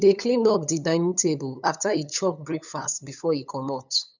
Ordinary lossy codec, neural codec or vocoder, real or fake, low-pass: none; codec, 16 kHz, 8 kbps, FunCodec, trained on LibriTTS, 25 frames a second; fake; 7.2 kHz